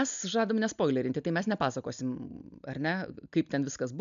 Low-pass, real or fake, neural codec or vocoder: 7.2 kHz; real; none